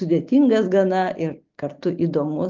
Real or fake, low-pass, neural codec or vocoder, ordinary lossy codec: real; 7.2 kHz; none; Opus, 24 kbps